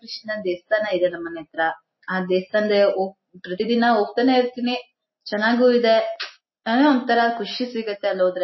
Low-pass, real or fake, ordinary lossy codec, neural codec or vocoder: 7.2 kHz; real; MP3, 24 kbps; none